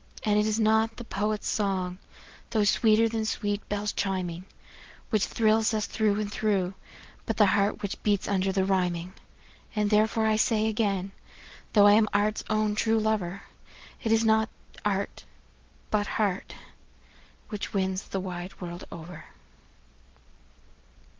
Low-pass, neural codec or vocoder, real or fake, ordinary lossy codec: 7.2 kHz; none; real; Opus, 16 kbps